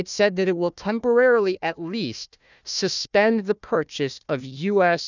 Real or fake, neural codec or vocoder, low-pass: fake; codec, 16 kHz, 1 kbps, FunCodec, trained on Chinese and English, 50 frames a second; 7.2 kHz